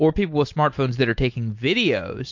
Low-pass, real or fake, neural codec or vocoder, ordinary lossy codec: 7.2 kHz; real; none; MP3, 48 kbps